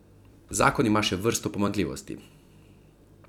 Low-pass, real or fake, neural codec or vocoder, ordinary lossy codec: 19.8 kHz; fake; vocoder, 44.1 kHz, 128 mel bands every 256 samples, BigVGAN v2; none